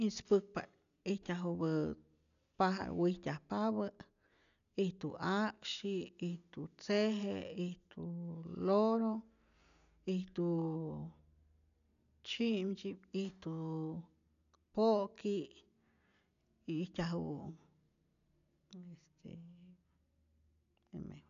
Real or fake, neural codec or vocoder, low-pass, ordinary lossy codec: real; none; 7.2 kHz; none